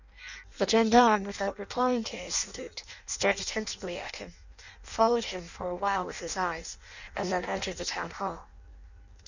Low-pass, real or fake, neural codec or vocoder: 7.2 kHz; fake; codec, 16 kHz in and 24 kHz out, 0.6 kbps, FireRedTTS-2 codec